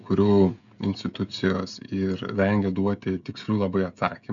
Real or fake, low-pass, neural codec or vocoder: fake; 7.2 kHz; codec, 16 kHz, 16 kbps, FreqCodec, smaller model